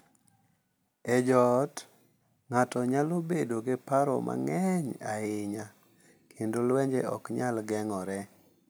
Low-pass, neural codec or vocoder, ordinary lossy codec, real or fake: none; none; none; real